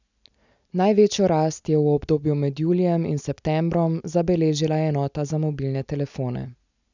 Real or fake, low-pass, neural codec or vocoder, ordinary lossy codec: real; 7.2 kHz; none; none